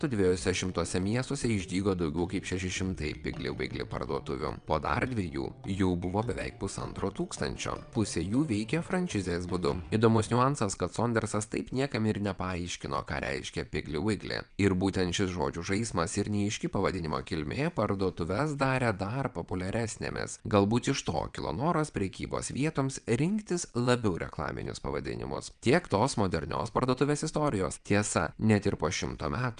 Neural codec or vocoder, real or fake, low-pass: vocoder, 22.05 kHz, 80 mel bands, WaveNeXt; fake; 9.9 kHz